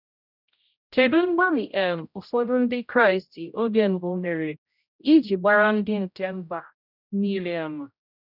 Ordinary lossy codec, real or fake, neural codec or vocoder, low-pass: none; fake; codec, 16 kHz, 0.5 kbps, X-Codec, HuBERT features, trained on general audio; 5.4 kHz